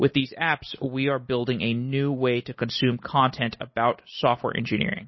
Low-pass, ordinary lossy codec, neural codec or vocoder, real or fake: 7.2 kHz; MP3, 24 kbps; none; real